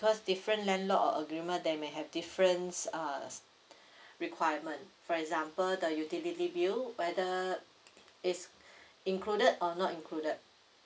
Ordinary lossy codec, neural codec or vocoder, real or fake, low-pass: none; none; real; none